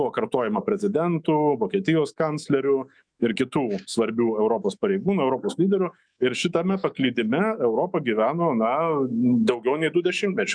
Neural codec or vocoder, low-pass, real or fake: autoencoder, 48 kHz, 128 numbers a frame, DAC-VAE, trained on Japanese speech; 9.9 kHz; fake